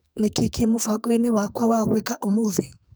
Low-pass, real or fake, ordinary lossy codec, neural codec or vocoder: none; fake; none; codec, 44.1 kHz, 2.6 kbps, SNAC